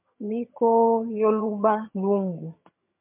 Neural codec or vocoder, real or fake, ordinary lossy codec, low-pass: vocoder, 22.05 kHz, 80 mel bands, HiFi-GAN; fake; MP3, 32 kbps; 3.6 kHz